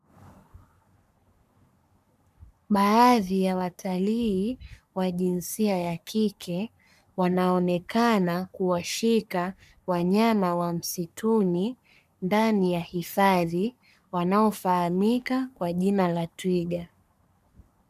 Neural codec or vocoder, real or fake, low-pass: codec, 44.1 kHz, 3.4 kbps, Pupu-Codec; fake; 14.4 kHz